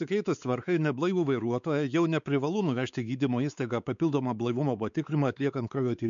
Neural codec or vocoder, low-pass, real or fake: codec, 16 kHz, 4 kbps, X-Codec, WavLM features, trained on Multilingual LibriSpeech; 7.2 kHz; fake